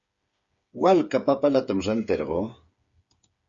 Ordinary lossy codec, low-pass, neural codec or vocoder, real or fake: Opus, 64 kbps; 7.2 kHz; codec, 16 kHz, 8 kbps, FreqCodec, smaller model; fake